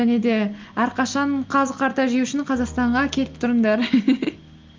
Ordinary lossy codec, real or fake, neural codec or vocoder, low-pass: Opus, 24 kbps; real; none; 7.2 kHz